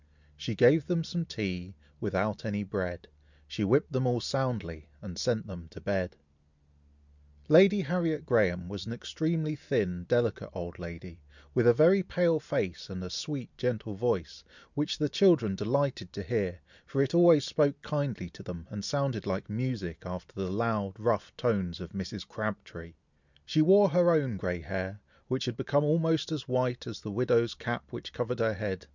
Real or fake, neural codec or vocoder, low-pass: real; none; 7.2 kHz